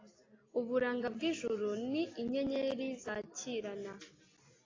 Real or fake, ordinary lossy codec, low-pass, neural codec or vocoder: real; AAC, 32 kbps; 7.2 kHz; none